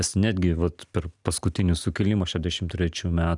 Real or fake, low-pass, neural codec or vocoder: real; 10.8 kHz; none